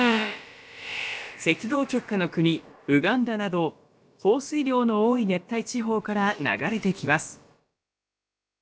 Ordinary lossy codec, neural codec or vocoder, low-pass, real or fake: none; codec, 16 kHz, about 1 kbps, DyCAST, with the encoder's durations; none; fake